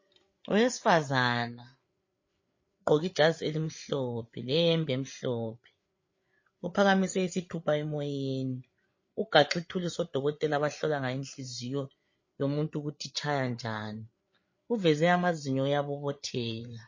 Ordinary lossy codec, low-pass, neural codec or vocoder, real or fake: MP3, 32 kbps; 7.2 kHz; codec, 16 kHz, 8 kbps, FreqCodec, larger model; fake